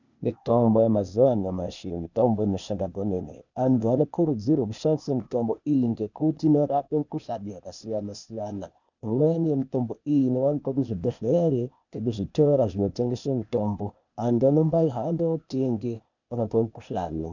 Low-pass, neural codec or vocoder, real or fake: 7.2 kHz; codec, 16 kHz, 0.8 kbps, ZipCodec; fake